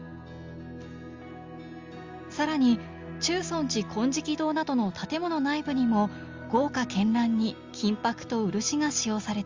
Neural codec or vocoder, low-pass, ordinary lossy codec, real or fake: none; 7.2 kHz; Opus, 32 kbps; real